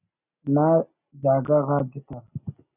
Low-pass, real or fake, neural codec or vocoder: 3.6 kHz; real; none